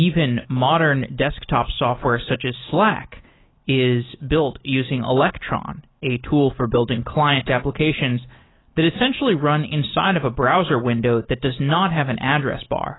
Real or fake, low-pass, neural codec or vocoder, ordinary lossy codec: real; 7.2 kHz; none; AAC, 16 kbps